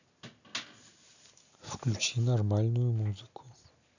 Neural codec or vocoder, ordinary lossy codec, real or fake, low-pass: none; none; real; 7.2 kHz